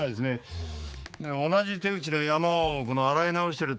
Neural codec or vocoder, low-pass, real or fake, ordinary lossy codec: codec, 16 kHz, 4 kbps, X-Codec, HuBERT features, trained on general audio; none; fake; none